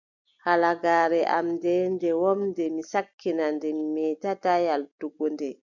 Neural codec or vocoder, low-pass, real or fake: none; 7.2 kHz; real